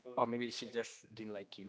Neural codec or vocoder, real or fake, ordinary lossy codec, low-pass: codec, 16 kHz, 2 kbps, X-Codec, HuBERT features, trained on general audio; fake; none; none